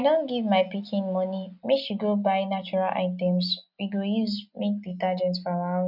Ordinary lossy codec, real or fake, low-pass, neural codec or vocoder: none; real; 5.4 kHz; none